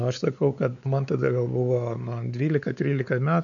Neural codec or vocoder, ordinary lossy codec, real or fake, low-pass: codec, 16 kHz, 4 kbps, X-Codec, WavLM features, trained on Multilingual LibriSpeech; MP3, 96 kbps; fake; 7.2 kHz